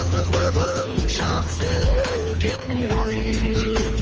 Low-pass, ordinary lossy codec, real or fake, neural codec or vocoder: 7.2 kHz; Opus, 24 kbps; fake; codec, 24 kHz, 3 kbps, HILCodec